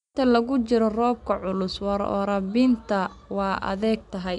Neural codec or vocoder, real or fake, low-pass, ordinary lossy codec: none; real; 10.8 kHz; none